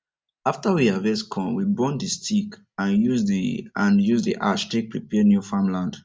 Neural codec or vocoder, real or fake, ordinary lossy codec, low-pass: none; real; none; none